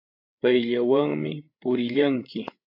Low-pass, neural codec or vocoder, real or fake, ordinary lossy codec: 5.4 kHz; codec, 16 kHz, 8 kbps, FreqCodec, larger model; fake; AAC, 32 kbps